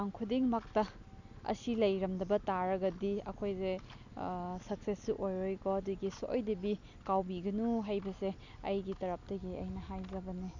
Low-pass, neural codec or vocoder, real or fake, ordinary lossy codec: 7.2 kHz; none; real; none